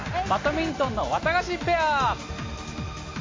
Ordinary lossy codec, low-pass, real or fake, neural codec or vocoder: MP3, 32 kbps; 7.2 kHz; real; none